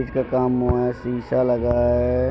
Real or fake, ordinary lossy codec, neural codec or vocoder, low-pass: real; none; none; none